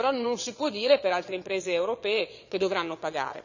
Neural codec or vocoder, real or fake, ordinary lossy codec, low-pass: vocoder, 22.05 kHz, 80 mel bands, Vocos; fake; none; 7.2 kHz